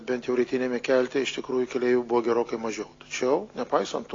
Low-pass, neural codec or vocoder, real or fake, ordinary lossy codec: 7.2 kHz; none; real; AAC, 32 kbps